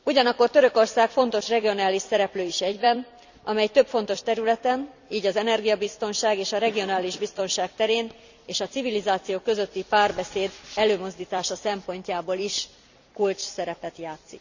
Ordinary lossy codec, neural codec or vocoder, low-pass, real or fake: none; vocoder, 44.1 kHz, 128 mel bands every 256 samples, BigVGAN v2; 7.2 kHz; fake